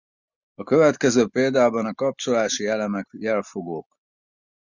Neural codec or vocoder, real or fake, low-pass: none; real; 7.2 kHz